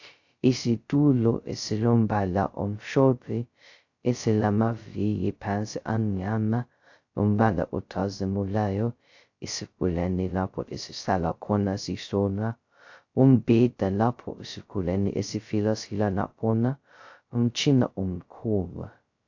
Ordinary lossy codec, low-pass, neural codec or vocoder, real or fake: AAC, 48 kbps; 7.2 kHz; codec, 16 kHz, 0.2 kbps, FocalCodec; fake